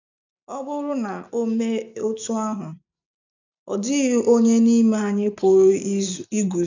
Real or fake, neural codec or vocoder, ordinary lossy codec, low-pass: real; none; none; 7.2 kHz